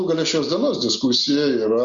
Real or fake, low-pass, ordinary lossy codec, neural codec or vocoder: real; 7.2 kHz; Opus, 64 kbps; none